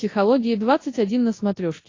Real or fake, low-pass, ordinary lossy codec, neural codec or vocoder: fake; 7.2 kHz; AAC, 32 kbps; codec, 24 kHz, 0.9 kbps, WavTokenizer, large speech release